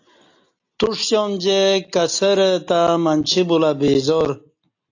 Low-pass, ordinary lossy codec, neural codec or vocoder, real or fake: 7.2 kHz; AAC, 48 kbps; none; real